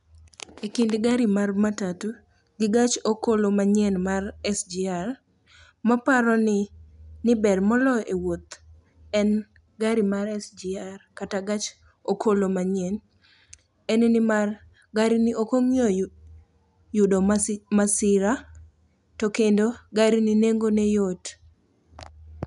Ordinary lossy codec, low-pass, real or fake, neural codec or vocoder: none; 10.8 kHz; real; none